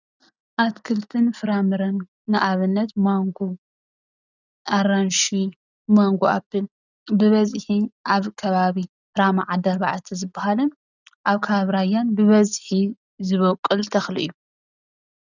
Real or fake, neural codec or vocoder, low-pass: real; none; 7.2 kHz